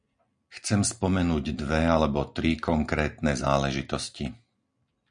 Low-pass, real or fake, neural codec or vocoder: 9.9 kHz; real; none